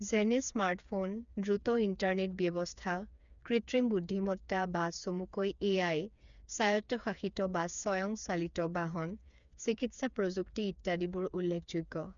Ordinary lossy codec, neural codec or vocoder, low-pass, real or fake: MP3, 96 kbps; codec, 16 kHz, 4 kbps, FreqCodec, smaller model; 7.2 kHz; fake